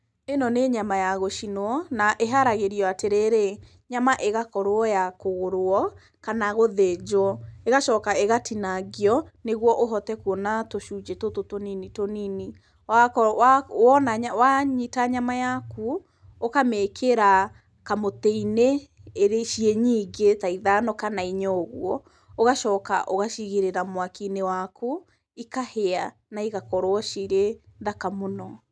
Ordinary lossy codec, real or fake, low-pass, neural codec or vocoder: none; real; none; none